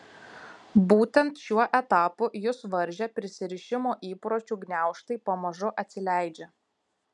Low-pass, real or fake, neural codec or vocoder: 10.8 kHz; real; none